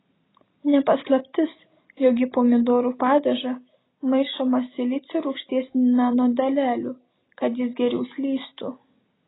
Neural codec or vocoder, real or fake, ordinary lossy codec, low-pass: none; real; AAC, 16 kbps; 7.2 kHz